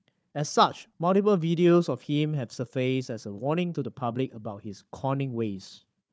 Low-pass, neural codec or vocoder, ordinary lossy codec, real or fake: none; codec, 16 kHz, 4 kbps, FunCodec, trained on Chinese and English, 50 frames a second; none; fake